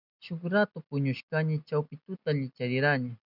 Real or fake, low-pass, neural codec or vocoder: real; 5.4 kHz; none